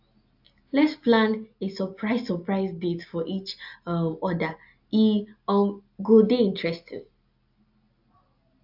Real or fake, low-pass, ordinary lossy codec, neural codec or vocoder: real; 5.4 kHz; AAC, 48 kbps; none